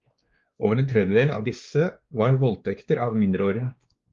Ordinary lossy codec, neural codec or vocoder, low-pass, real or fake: Opus, 32 kbps; codec, 16 kHz, 2 kbps, X-Codec, WavLM features, trained on Multilingual LibriSpeech; 7.2 kHz; fake